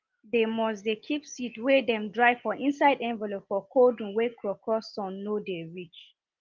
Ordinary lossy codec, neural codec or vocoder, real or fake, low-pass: Opus, 24 kbps; none; real; 7.2 kHz